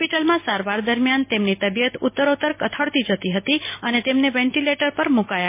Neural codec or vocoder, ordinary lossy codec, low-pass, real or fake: none; MP3, 24 kbps; 3.6 kHz; real